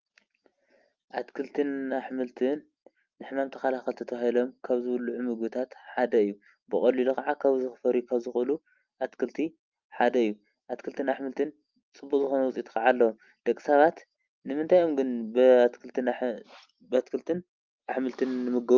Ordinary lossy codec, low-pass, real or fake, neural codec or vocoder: Opus, 32 kbps; 7.2 kHz; real; none